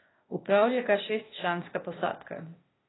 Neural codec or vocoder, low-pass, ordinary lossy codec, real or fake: codec, 16 kHz, 0.8 kbps, ZipCodec; 7.2 kHz; AAC, 16 kbps; fake